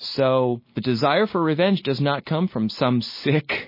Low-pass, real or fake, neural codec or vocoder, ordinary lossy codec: 5.4 kHz; real; none; MP3, 24 kbps